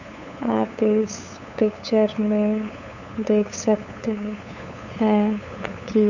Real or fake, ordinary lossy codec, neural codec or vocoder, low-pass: fake; none; codec, 16 kHz, 8 kbps, FunCodec, trained on LibriTTS, 25 frames a second; 7.2 kHz